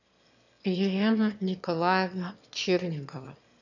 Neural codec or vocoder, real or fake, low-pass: autoencoder, 22.05 kHz, a latent of 192 numbers a frame, VITS, trained on one speaker; fake; 7.2 kHz